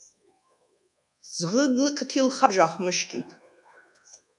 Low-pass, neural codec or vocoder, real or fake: 10.8 kHz; codec, 24 kHz, 1.2 kbps, DualCodec; fake